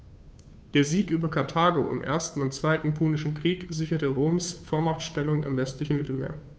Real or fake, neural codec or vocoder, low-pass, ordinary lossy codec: fake; codec, 16 kHz, 2 kbps, FunCodec, trained on Chinese and English, 25 frames a second; none; none